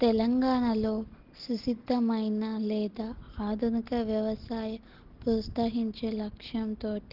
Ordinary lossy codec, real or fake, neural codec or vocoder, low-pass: Opus, 16 kbps; real; none; 5.4 kHz